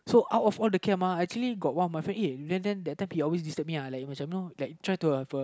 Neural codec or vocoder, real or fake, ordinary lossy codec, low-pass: none; real; none; none